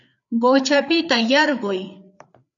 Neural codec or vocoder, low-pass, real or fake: codec, 16 kHz, 4 kbps, FreqCodec, larger model; 7.2 kHz; fake